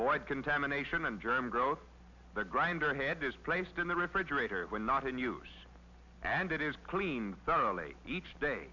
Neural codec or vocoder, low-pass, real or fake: none; 7.2 kHz; real